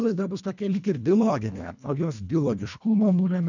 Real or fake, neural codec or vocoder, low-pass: fake; codec, 24 kHz, 1.5 kbps, HILCodec; 7.2 kHz